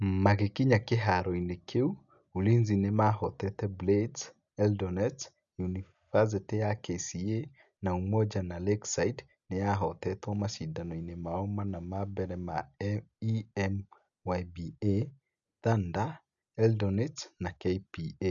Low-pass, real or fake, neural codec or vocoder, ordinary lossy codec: 7.2 kHz; real; none; none